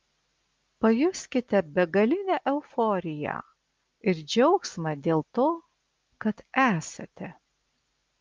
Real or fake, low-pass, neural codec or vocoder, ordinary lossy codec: real; 7.2 kHz; none; Opus, 32 kbps